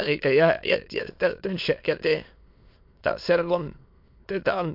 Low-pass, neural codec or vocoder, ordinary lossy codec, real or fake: 5.4 kHz; autoencoder, 22.05 kHz, a latent of 192 numbers a frame, VITS, trained on many speakers; MP3, 48 kbps; fake